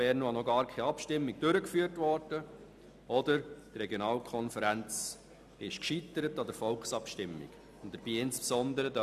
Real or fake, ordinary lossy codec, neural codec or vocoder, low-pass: real; none; none; 14.4 kHz